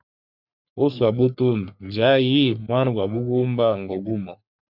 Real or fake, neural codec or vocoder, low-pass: fake; codec, 44.1 kHz, 3.4 kbps, Pupu-Codec; 5.4 kHz